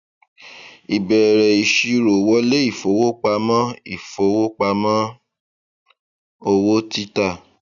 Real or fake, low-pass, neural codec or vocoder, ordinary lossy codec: real; 7.2 kHz; none; none